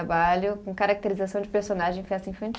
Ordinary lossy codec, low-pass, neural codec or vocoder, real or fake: none; none; none; real